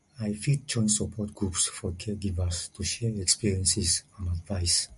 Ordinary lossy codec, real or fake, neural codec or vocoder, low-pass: MP3, 48 kbps; real; none; 14.4 kHz